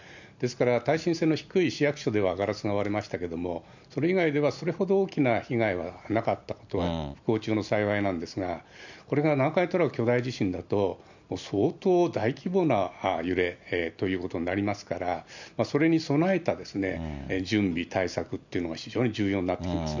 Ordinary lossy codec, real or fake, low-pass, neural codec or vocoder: none; real; 7.2 kHz; none